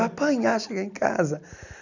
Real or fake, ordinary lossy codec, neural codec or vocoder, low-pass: real; none; none; 7.2 kHz